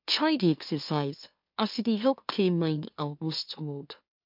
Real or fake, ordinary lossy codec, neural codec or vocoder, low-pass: fake; MP3, 48 kbps; autoencoder, 44.1 kHz, a latent of 192 numbers a frame, MeloTTS; 5.4 kHz